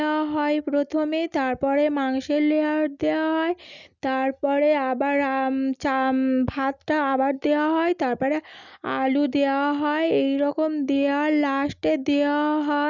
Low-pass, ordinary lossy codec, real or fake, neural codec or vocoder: 7.2 kHz; none; real; none